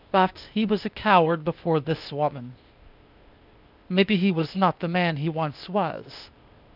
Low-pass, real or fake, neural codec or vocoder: 5.4 kHz; fake; codec, 16 kHz, 0.8 kbps, ZipCodec